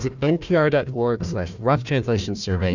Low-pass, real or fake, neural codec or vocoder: 7.2 kHz; fake; codec, 16 kHz, 1 kbps, FunCodec, trained on Chinese and English, 50 frames a second